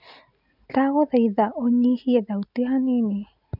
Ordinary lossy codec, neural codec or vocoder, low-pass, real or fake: none; none; 5.4 kHz; real